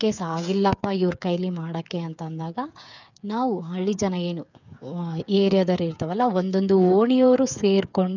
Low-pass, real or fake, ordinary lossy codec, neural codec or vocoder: 7.2 kHz; fake; none; codec, 16 kHz, 8 kbps, FreqCodec, smaller model